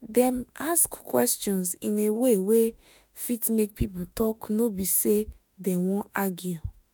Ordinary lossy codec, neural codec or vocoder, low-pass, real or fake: none; autoencoder, 48 kHz, 32 numbers a frame, DAC-VAE, trained on Japanese speech; none; fake